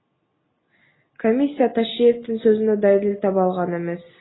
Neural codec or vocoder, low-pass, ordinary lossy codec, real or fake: none; 7.2 kHz; AAC, 16 kbps; real